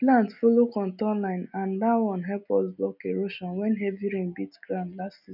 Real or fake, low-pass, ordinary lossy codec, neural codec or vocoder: real; 5.4 kHz; none; none